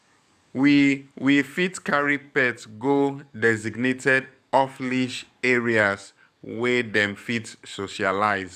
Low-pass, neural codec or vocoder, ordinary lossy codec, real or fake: 14.4 kHz; codec, 44.1 kHz, 7.8 kbps, DAC; none; fake